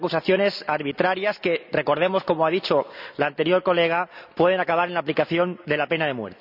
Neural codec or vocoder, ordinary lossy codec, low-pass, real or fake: none; none; 5.4 kHz; real